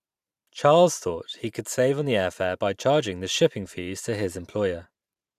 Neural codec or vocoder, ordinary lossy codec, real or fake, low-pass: vocoder, 44.1 kHz, 128 mel bands every 512 samples, BigVGAN v2; none; fake; 14.4 kHz